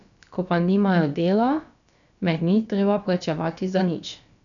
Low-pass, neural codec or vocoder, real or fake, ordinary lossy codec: 7.2 kHz; codec, 16 kHz, about 1 kbps, DyCAST, with the encoder's durations; fake; none